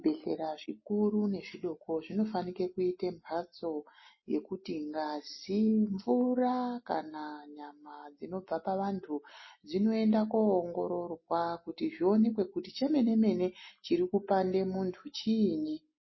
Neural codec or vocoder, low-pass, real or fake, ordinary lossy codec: none; 7.2 kHz; real; MP3, 24 kbps